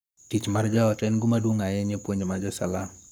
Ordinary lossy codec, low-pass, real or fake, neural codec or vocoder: none; none; fake; codec, 44.1 kHz, 7.8 kbps, Pupu-Codec